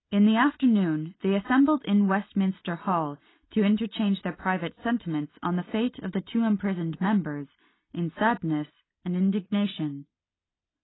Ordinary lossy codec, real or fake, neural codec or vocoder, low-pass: AAC, 16 kbps; real; none; 7.2 kHz